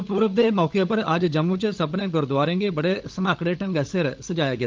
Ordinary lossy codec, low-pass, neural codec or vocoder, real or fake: Opus, 32 kbps; 7.2 kHz; codec, 16 kHz, 16 kbps, FunCodec, trained on LibriTTS, 50 frames a second; fake